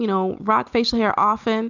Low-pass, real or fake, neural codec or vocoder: 7.2 kHz; real; none